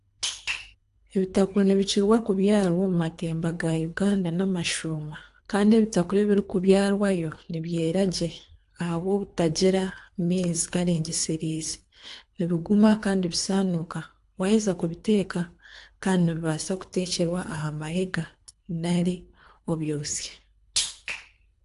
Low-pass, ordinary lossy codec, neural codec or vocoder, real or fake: 10.8 kHz; AAC, 64 kbps; codec, 24 kHz, 3 kbps, HILCodec; fake